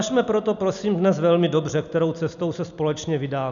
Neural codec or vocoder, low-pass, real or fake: none; 7.2 kHz; real